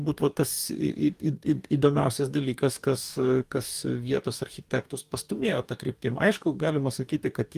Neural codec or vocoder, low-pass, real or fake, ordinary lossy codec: codec, 44.1 kHz, 2.6 kbps, DAC; 14.4 kHz; fake; Opus, 32 kbps